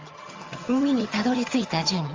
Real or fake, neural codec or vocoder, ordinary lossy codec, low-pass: fake; vocoder, 22.05 kHz, 80 mel bands, HiFi-GAN; Opus, 32 kbps; 7.2 kHz